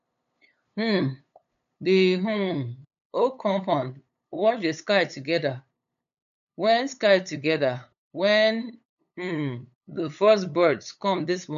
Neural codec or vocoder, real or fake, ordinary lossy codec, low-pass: codec, 16 kHz, 8 kbps, FunCodec, trained on LibriTTS, 25 frames a second; fake; none; 7.2 kHz